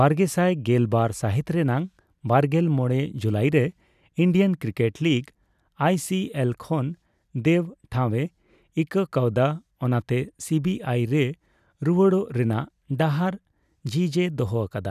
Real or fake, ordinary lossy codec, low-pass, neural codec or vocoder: real; none; 14.4 kHz; none